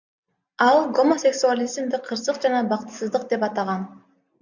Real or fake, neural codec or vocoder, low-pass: real; none; 7.2 kHz